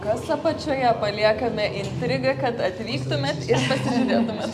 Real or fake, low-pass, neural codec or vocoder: real; 14.4 kHz; none